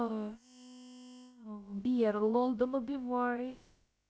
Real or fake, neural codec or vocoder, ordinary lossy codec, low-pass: fake; codec, 16 kHz, about 1 kbps, DyCAST, with the encoder's durations; none; none